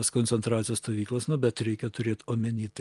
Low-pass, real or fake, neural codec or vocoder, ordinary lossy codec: 10.8 kHz; real; none; Opus, 32 kbps